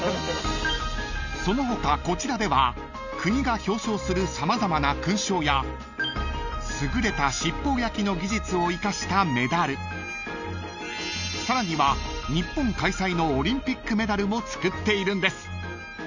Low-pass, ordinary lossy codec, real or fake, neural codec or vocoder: 7.2 kHz; none; real; none